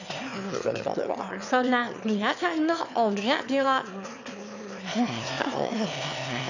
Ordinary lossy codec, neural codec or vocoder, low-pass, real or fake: none; autoencoder, 22.05 kHz, a latent of 192 numbers a frame, VITS, trained on one speaker; 7.2 kHz; fake